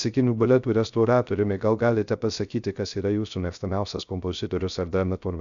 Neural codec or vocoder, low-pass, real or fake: codec, 16 kHz, 0.3 kbps, FocalCodec; 7.2 kHz; fake